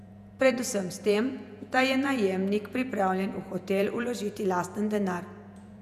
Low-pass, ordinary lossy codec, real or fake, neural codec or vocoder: 14.4 kHz; none; fake; vocoder, 48 kHz, 128 mel bands, Vocos